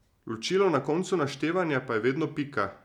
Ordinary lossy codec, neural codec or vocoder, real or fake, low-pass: none; none; real; 19.8 kHz